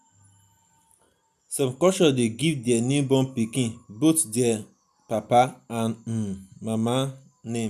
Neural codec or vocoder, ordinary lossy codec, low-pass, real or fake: none; none; 14.4 kHz; real